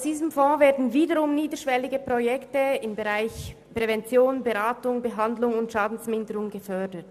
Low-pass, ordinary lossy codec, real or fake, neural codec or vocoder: 14.4 kHz; none; real; none